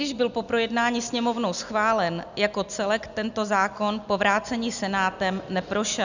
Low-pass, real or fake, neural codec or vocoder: 7.2 kHz; real; none